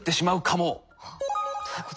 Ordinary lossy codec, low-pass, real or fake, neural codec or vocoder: none; none; real; none